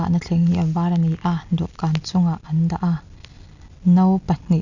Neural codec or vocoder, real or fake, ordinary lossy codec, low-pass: none; real; none; 7.2 kHz